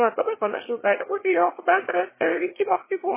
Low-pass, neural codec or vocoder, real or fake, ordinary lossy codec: 3.6 kHz; autoencoder, 22.05 kHz, a latent of 192 numbers a frame, VITS, trained on one speaker; fake; MP3, 16 kbps